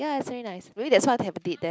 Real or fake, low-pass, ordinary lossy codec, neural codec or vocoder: real; none; none; none